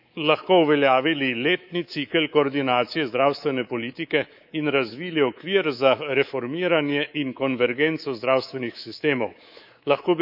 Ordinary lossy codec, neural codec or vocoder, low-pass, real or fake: none; codec, 24 kHz, 3.1 kbps, DualCodec; 5.4 kHz; fake